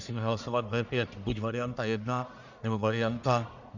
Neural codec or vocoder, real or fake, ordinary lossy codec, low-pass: codec, 44.1 kHz, 1.7 kbps, Pupu-Codec; fake; Opus, 64 kbps; 7.2 kHz